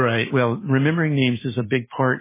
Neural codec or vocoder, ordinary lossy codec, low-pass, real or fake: none; MP3, 16 kbps; 3.6 kHz; real